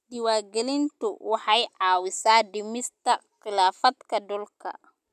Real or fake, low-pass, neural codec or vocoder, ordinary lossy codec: real; 14.4 kHz; none; none